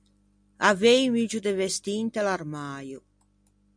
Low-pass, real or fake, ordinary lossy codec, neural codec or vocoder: 9.9 kHz; real; MP3, 64 kbps; none